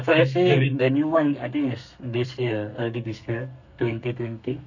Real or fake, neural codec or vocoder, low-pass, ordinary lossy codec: fake; codec, 32 kHz, 1.9 kbps, SNAC; 7.2 kHz; none